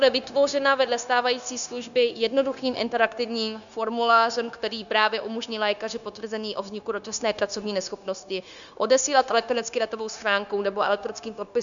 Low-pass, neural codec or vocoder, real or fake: 7.2 kHz; codec, 16 kHz, 0.9 kbps, LongCat-Audio-Codec; fake